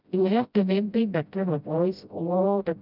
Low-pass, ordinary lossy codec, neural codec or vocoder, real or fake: 5.4 kHz; none; codec, 16 kHz, 0.5 kbps, FreqCodec, smaller model; fake